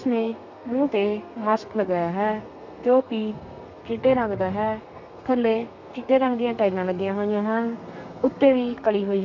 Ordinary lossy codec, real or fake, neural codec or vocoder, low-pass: none; fake; codec, 32 kHz, 1.9 kbps, SNAC; 7.2 kHz